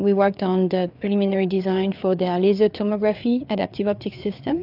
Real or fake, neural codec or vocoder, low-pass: fake; codec, 16 kHz, 4 kbps, FreqCodec, larger model; 5.4 kHz